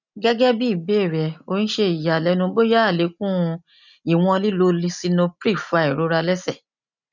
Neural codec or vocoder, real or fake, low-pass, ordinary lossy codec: none; real; 7.2 kHz; none